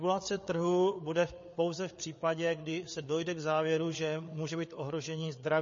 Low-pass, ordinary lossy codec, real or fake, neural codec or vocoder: 7.2 kHz; MP3, 32 kbps; fake; codec, 16 kHz, 8 kbps, FreqCodec, larger model